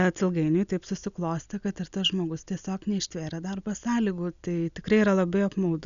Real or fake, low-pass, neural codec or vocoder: real; 7.2 kHz; none